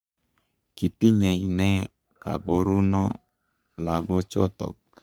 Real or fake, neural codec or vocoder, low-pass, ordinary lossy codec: fake; codec, 44.1 kHz, 3.4 kbps, Pupu-Codec; none; none